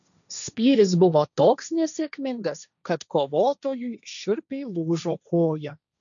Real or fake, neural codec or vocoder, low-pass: fake; codec, 16 kHz, 1.1 kbps, Voila-Tokenizer; 7.2 kHz